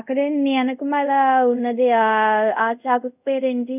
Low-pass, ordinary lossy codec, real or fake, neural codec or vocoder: 3.6 kHz; none; fake; codec, 24 kHz, 0.5 kbps, DualCodec